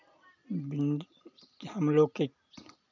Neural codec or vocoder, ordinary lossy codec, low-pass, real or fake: none; none; 7.2 kHz; real